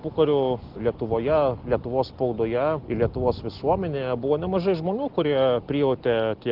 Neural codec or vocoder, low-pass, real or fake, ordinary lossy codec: none; 5.4 kHz; real; Opus, 16 kbps